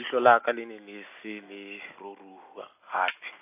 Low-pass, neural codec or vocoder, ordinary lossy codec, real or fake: 3.6 kHz; none; AAC, 24 kbps; real